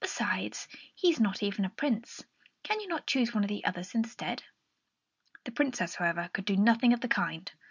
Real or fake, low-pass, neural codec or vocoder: real; 7.2 kHz; none